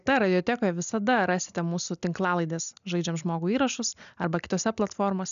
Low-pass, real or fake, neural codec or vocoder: 7.2 kHz; real; none